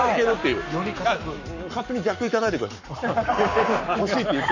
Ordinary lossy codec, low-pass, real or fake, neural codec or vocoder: none; 7.2 kHz; fake; codec, 44.1 kHz, 7.8 kbps, Pupu-Codec